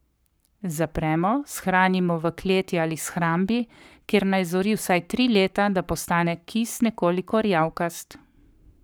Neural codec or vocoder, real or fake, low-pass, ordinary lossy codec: codec, 44.1 kHz, 7.8 kbps, Pupu-Codec; fake; none; none